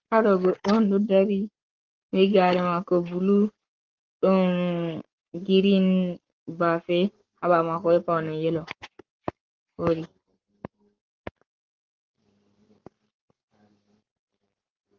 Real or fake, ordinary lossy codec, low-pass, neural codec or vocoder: fake; Opus, 16 kbps; 7.2 kHz; codec, 44.1 kHz, 7.8 kbps, Pupu-Codec